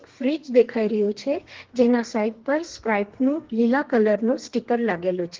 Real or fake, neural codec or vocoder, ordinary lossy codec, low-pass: fake; codec, 32 kHz, 1.9 kbps, SNAC; Opus, 16 kbps; 7.2 kHz